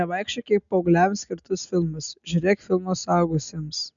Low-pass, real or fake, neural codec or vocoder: 7.2 kHz; real; none